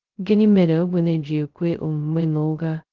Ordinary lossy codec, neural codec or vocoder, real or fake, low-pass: Opus, 16 kbps; codec, 16 kHz, 0.2 kbps, FocalCodec; fake; 7.2 kHz